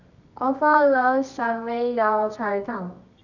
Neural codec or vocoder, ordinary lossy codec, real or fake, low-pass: codec, 24 kHz, 0.9 kbps, WavTokenizer, medium music audio release; none; fake; 7.2 kHz